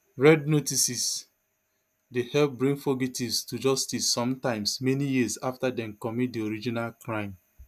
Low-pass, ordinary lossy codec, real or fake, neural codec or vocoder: 14.4 kHz; none; real; none